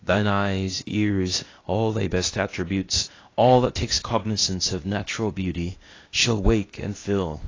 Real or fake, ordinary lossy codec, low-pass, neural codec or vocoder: fake; AAC, 32 kbps; 7.2 kHz; codec, 16 kHz, 0.8 kbps, ZipCodec